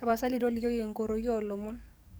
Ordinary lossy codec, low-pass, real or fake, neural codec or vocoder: none; none; fake; codec, 44.1 kHz, 7.8 kbps, DAC